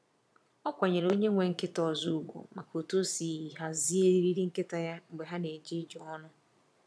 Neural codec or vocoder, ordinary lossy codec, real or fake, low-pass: vocoder, 22.05 kHz, 80 mel bands, Vocos; none; fake; none